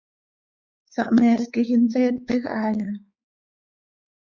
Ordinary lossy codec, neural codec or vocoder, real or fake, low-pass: Opus, 64 kbps; codec, 16 kHz, 4 kbps, X-Codec, WavLM features, trained on Multilingual LibriSpeech; fake; 7.2 kHz